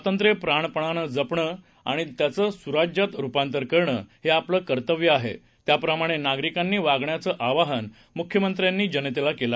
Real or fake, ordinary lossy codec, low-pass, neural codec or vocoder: real; none; none; none